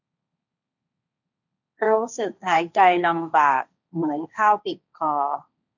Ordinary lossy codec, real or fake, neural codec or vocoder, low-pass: none; fake; codec, 16 kHz, 1.1 kbps, Voila-Tokenizer; 7.2 kHz